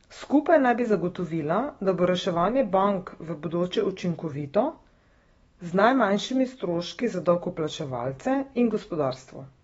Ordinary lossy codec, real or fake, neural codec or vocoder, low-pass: AAC, 24 kbps; fake; autoencoder, 48 kHz, 128 numbers a frame, DAC-VAE, trained on Japanese speech; 19.8 kHz